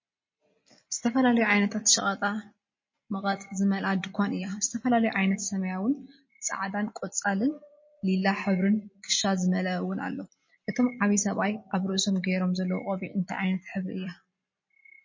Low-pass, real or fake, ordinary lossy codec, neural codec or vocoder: 7.2 kHz; real; MP3, 32 kbps; none